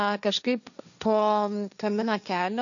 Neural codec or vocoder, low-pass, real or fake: codec, 16 kHz, 1.1 kbps, Voila-Tokenizer; 7.2 kHz; fake